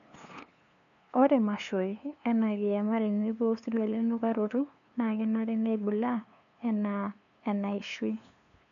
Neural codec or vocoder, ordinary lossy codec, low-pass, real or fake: codec, 16 kHz, 2 kbps, FunCodec, trained on LibriTTS, 25 frames a second; none; 7.2 kHz; fake